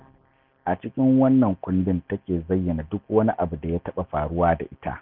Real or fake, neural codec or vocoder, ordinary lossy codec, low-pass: real; none; none; 5.4 kHz